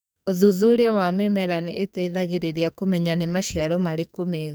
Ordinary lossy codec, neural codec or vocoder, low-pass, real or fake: none; codec, 44.1 kHz, 2.6 kbps, SNAC; none; fake